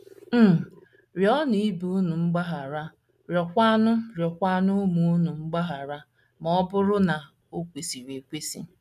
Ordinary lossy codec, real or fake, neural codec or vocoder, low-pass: none; real; none; 14.4 kHz